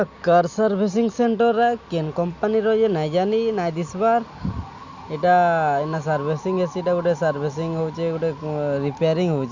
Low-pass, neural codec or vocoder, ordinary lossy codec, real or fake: 7.2 kHz; none; Opus, 64 kbps; real